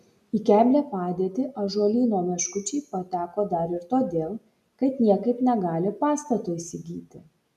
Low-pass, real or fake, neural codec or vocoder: 14.4 kHz; real; none